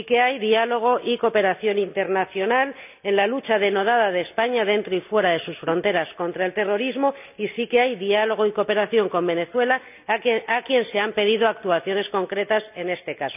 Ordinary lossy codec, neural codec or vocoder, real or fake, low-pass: none; none; real; 3.6 kHz